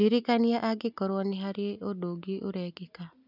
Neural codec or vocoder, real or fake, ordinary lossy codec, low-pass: none; real; none; 5.4 kHz